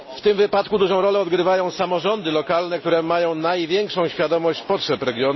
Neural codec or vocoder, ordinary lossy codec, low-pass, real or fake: none; MP3, 24 kbps; 7.2 kHz; real